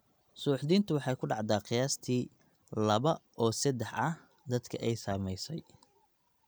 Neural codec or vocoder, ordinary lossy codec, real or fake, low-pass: none; none; real; none